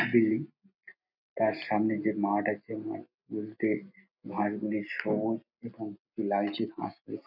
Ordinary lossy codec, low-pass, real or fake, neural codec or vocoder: none; 5.4 kHz; real; none